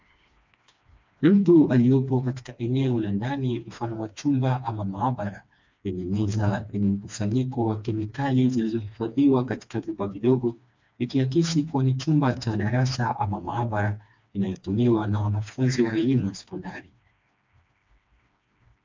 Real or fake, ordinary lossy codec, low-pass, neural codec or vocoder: fake; MP3, 64 kbps; 7.2 kHz; codec, 16 kHz, 2 kbps, FreqCodec, smaller model